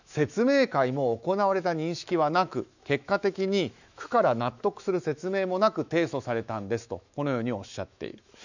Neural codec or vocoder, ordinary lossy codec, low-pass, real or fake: autoencoder, 48 kHz, 128 numbers a frame, DAC-VAE, trained on Japanese speech; none; 7.2 kHz; fake